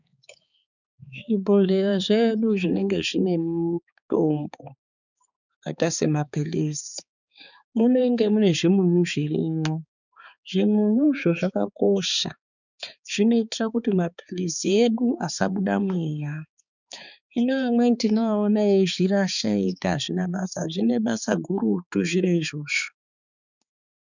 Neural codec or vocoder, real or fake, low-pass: codec, 16 kHz, 4 kbps, X-Codec, HuBERT features, trained on balanced general audio; fake; 7.2 kHz